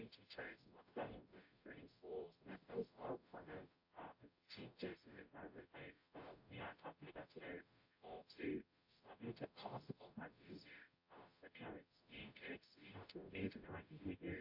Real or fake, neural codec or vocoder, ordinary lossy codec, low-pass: fake; codec, 44.1 kHz, 0.9 kbps, DAC; AAC, 48 kbps; 5.4 kHz